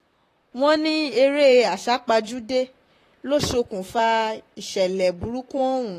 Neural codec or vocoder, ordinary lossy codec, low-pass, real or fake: vocoder, 44.1 kHz, 128 mel bands, Pupu-Vocoder; AAC, 48 kbps; 14.4 kHz; fake